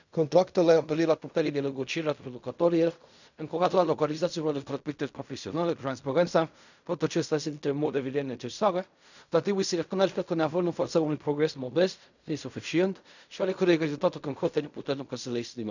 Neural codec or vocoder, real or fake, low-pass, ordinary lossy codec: codec, 16 kHz in and 24 kHz out, 0.4 kbps, LongCat-Audio-Codec, fine tuned four codebook decoder; fake; 7.2 kHz; none